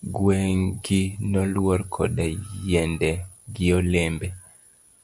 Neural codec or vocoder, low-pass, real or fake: none; 10.8 kHz; real